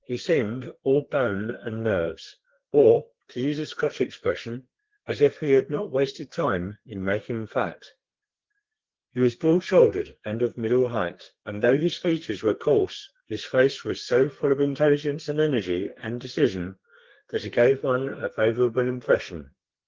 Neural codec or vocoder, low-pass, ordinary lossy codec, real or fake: codec, 32 kHz, 1.9 kbps, SNAC; 7.2 kHz; Opus, 16 kbps; fake